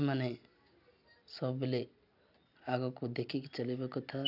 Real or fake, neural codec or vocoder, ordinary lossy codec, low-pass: real; none; none; 5.4 kHz